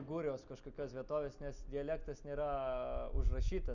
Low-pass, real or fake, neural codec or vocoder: 7.2 kHz; real; none